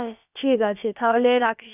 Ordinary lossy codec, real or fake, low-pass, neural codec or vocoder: none; fake; 3.6 kHz; codec, 16 kHz, about 1 kbps, DyCAST, with the encoder's durations